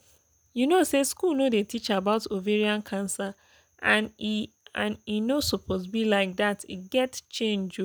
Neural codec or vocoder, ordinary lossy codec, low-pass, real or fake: none; none; none; real